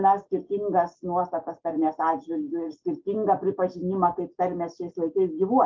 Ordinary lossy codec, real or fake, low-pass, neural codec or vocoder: Opus, 32 kbps; real; 7.2 kHz; none